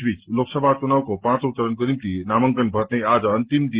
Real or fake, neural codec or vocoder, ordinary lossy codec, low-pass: real; none; Opus, 16 kbps; 3.6 kHz